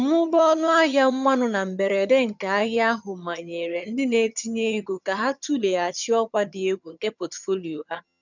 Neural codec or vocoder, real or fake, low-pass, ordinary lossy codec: vocoder, 22.05 kHz, 80 mel bands, HiFi-GAN; fake; 7.2 kHz; none